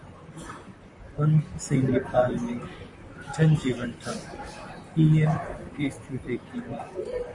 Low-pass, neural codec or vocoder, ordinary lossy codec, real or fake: 10.8 kHz; vocoder, 44.1 kHz, 128 mel bands, Pupu-Vocoder; MP3, 48 kbps; fake